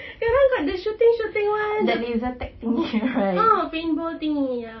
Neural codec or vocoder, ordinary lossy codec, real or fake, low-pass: none; MP3, 24 kbps; real; 7.2 kHz